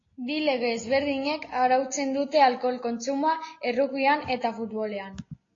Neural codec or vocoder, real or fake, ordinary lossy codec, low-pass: none; real; AAC, 32 kbps; 7.2 kHz